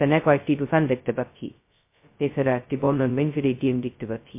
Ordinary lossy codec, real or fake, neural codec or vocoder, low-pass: MP3, 24 kbps; fake; codec, 16 kHz, 0.2 kbps, FocalCodec; 3.6 kHz